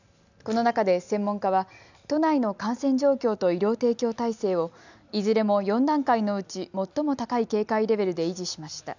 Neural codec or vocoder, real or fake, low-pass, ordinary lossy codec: none; real; 7.2 kHz; none